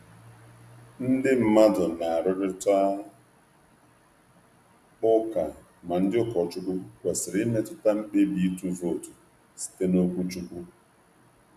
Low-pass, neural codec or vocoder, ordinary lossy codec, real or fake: 14.4 kHz; none; none; real